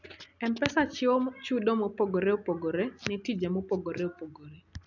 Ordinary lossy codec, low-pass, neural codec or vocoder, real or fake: none; 7.2 kHz; none; real